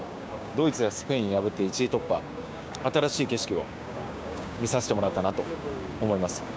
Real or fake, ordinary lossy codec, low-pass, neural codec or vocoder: fake; none; none; codec, 16 kHz, 6 kbps, DAC